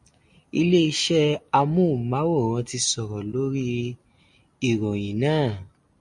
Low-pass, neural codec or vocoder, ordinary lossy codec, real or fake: 10.8 kHz; none; MP3, 48 kbps; real